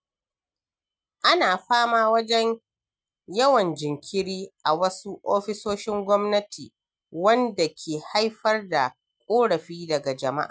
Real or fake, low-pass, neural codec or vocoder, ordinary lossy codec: real; none; none; none